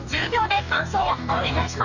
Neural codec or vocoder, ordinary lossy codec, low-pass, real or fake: codec, 44.1 kHz, 2.6 kbps, DAC; none; 7.2 kHz; fake